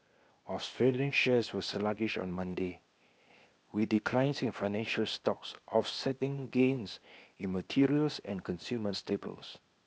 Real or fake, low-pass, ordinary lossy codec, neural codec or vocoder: fake; none; none; codec, 16 kHz, 0.8 kbps, ZipCodec